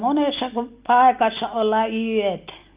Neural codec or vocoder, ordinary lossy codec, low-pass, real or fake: none; Opus, 24 kbps; 3.6 kHz; real